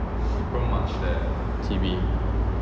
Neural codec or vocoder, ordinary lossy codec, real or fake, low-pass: none; none; real; none